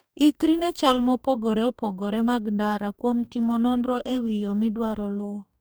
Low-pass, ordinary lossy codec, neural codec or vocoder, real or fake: none; none; codec, 44.1 kHz, 2.6 kbps, DAC; fake